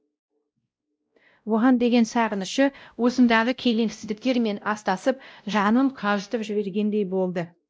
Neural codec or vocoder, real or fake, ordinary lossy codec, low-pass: codec, 16 kHz, 0.5 kbps, X-Codec, WavLM features, trained on Multilingual LibriSpeech; fake; none; none